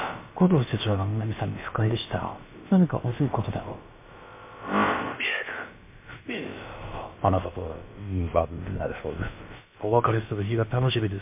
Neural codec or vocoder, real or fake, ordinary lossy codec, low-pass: codec, 16 kHz, about 1 kbps, DyCAST, with the encoder's durations; fake; MP3, 24 kbps; 3.6 kHz